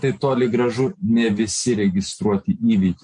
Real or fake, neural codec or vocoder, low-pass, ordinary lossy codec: real; none; 9.9 kHz; MP3, 48 kbps